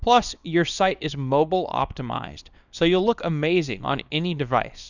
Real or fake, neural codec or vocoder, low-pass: fake; codec, 24 kHz, 0.9 kbps, WavTokenizer, small release; 7.2 kHz